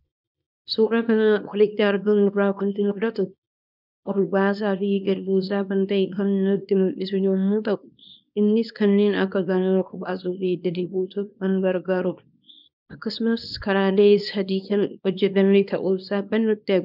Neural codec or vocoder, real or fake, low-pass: codec, 24 kHz, 0.9 kbps, WavTokenizer, small release; fake; 5.4 kHz